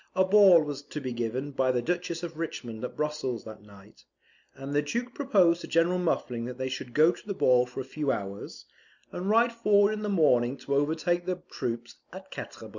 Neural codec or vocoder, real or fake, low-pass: none; real; 7.2 kHz